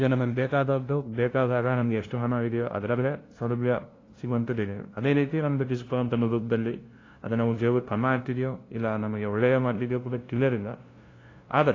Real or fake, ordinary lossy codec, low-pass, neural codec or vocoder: fake; AAC, 32 kbps; 7.2 kHz; codec, 16 kHz, 0.5 kbps, FunCodec, trained on LibriTTS, 25 frames a second